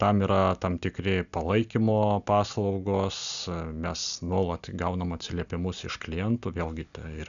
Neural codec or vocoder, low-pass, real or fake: none; 7.2 kHz; real